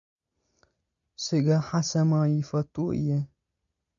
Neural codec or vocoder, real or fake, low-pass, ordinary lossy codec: none; real; 7.2 kHz; MP3, 96 kbps